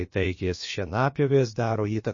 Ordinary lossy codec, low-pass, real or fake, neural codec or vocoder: MP3, 32 kbps; 7.2 kHz; fake; codec, 16 kHz, about 1 kbps, DyCAST, with the encoder's durations